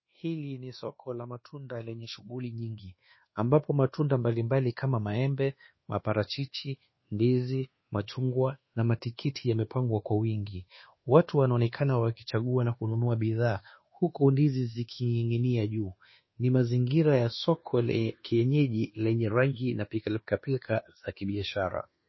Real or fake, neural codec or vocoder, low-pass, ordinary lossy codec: fake; codec, 24 kHz, 1.2 kbps, DualCodec; 7.2 kHz; MP3, 24 kbps